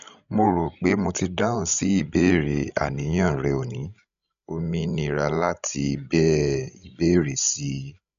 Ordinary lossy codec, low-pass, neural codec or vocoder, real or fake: none; 7.2 kHz; codec, 16 kHz, 16 kbps, FreqCodec, larger model; fake